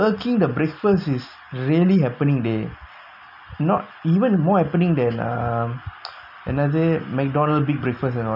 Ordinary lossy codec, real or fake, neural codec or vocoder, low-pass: none; real; none; 5.4 kHz